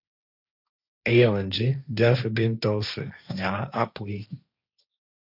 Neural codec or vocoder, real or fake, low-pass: codec, 16 kHz, 1.1 kbps, Voila-Tokenizer; fake; 5.4 kHz